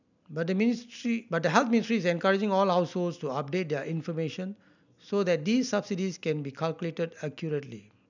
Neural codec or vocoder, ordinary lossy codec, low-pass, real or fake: none; none; 7.2 kHz; real